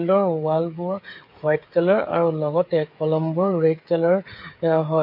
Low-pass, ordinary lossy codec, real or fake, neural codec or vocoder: 5.4 kHz; MP3, 32 kbps; fake; codec, 16 kHz, 8 kbps, FreqCodec, smaller model